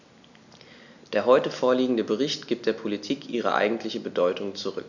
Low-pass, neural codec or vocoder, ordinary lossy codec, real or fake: 7.2 kHz; none; none; real